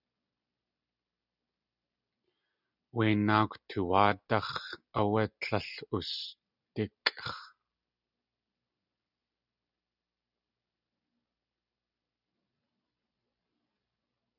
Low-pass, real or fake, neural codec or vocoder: 5.4 kHz; real; none